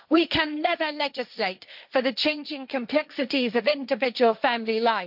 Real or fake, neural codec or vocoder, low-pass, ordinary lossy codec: fake; codec, 16 kHz, 1.1 kbps, Voila-Tokenizer; 5.4 kHz; none